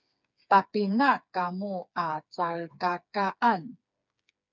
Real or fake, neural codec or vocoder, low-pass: fake; codec, 16 kHz, 4 kbps, FreqCodec, smaller model; 7.2 kHz